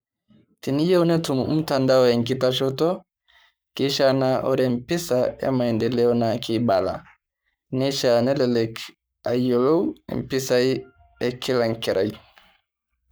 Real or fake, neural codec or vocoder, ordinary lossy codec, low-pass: fake; codec, 44.1 kHz, 7.8 kbps, Pupu-Codec; none; none